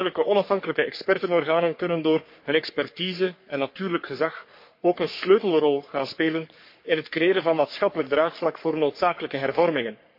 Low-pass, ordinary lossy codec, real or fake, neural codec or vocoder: 5.4 kHz; MP3, 32 kbps; fake; codec, 44.1 kHz, 3.4 kbps, Pupu-Codec